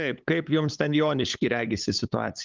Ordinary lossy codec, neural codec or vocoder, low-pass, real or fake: Opus, 24 kbps; codec, 16 kHz, 8 kbps, FunCodec, trained on LibriTTS, 25 frames a second; 7.2 kHz; fake